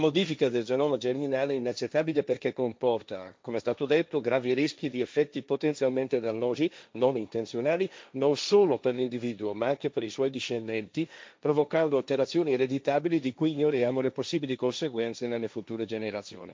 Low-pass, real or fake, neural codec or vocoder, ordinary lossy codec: none; fake; codec, 16 kHz, 1.1 kbps, Voila-Tokenizer; none